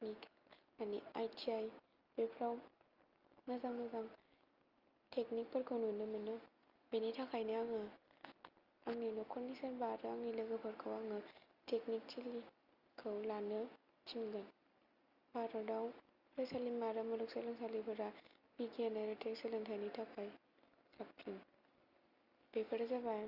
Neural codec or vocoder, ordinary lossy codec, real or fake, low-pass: none; Opus, 32 kbps; real; 5.4 kHz